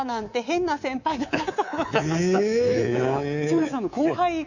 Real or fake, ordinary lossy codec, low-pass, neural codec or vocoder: fake; none; 7.2 kHz; codec, 24 kHz, 3.1 kbps, DualCodec